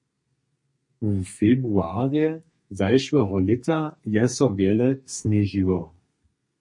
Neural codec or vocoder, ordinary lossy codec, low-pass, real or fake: codec, 32 kHz, 1.9 kbps, SNAC; MP3, 48 kbps; 10.8 kHz; fake